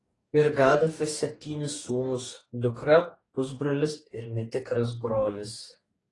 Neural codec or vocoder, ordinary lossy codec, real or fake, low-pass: codec, 44.1 kHz, 2.6 kbps, DAC; AAC, 32 kbps; fake; 10.8 kHz